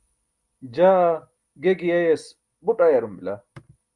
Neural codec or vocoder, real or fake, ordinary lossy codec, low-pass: none; real; Opus, 32 kbps; 10.8 kHz